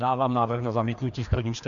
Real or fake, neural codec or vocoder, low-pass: fake; codec, 16 kHz, 2 kbps, FreqCodec, larger model; 7.2 kHz